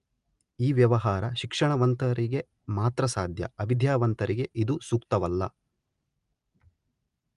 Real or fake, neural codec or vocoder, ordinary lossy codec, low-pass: real; none; Opus, 32 kbps; 10.8 kHz